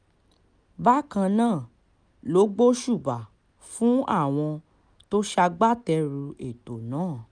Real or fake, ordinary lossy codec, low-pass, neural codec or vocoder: real; none; 9.9 kHz; none